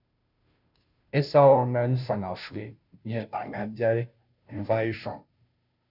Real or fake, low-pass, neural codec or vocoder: fake; 5.4 kHz; codec, 16 kHz, 0.5 kbps, FunCodec, trained on Chinese and English, 25 frames a second